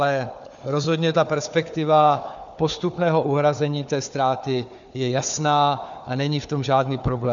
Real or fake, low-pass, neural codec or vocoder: fake; 7.2 kHz; codec, 16 kHz, 4 kbps, FunCodec, trained on Chinese and English, 50 frames a second